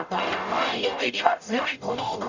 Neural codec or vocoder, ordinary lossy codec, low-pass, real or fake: codec, 44.1 kHz, 0.9 kbps, DAC; none; 7.2 kHz; fake